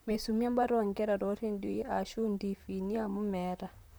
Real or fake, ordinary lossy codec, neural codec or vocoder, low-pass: fake; none; vocoder, 44.1 kHz, 128 mel bands, Pupu-Vocoder; none